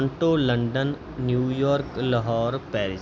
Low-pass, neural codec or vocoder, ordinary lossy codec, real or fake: none; none; none; real